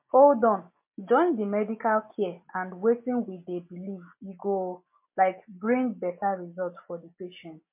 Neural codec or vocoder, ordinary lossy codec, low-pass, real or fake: none; MP3, 24 kbps; 3.6 kHz; real